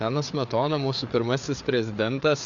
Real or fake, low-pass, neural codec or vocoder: fake; 7.2 kHz; codec, 16 kHz, 4 kbps, FreqCodec, larger model